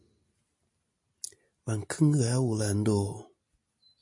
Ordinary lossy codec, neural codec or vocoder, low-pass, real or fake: MP3, 48 kbps; none; 10.8 kHz; real